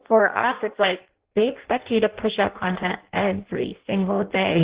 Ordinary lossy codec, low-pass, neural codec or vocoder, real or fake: Opus, 16 kbps; 3.6 kHz; codec, 16 kHz in and 24 kHz out, 0.6 kbps, FireRedTTS-2 codec; fake